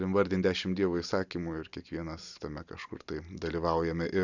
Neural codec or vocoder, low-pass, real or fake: none; 7.2 kHz; real